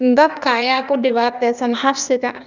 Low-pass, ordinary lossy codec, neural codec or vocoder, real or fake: 7.2 kHz; none; codec, 16 kHz, 0.8 kbps, ZipCodec; fake